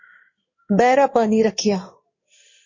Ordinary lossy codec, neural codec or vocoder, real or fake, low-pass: MP3, 32 kbps; codec, 16 kHz in and 24 kHz out, 1 kbps, XY-Tokenizer; fake; 7.2 kHz